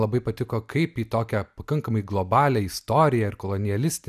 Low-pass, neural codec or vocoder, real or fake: 14.4 kHz; none; real